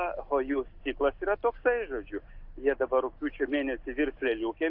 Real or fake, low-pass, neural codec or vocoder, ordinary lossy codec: real; 5.4 kHz; none; AAC, 48 kbps